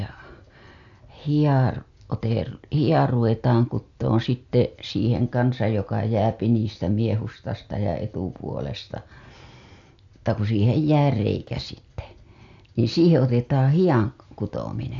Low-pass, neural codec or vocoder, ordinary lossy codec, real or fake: 7.2 kHz; none; AAC, 64 kbps; real